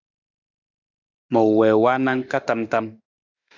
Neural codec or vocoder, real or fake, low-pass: autoencoder, 48 kHz, 32 numbers a frame, DAC-VAE, trained on Japanese speech; fake; 7.2 kHz